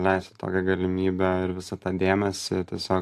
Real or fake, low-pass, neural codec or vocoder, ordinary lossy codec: real; 14.4 kHz; none; AAC, 64 kbps